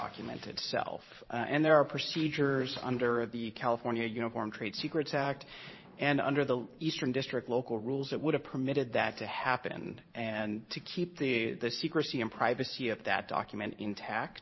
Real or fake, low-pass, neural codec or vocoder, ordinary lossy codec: fake; 7.2 kHz; vocoder, 44.1 kHz, 128 mel bands every 512 samples, BigVGAN v2; MP3, 24 kbps